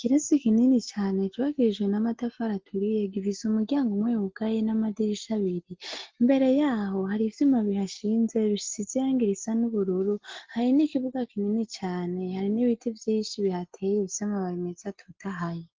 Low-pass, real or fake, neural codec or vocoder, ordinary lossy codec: 7.2 kHz; real; none; Opus, 16 kbps